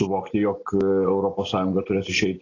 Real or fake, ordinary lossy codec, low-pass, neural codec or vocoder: real; AAC, 32 kbps; 7.2 kHz; none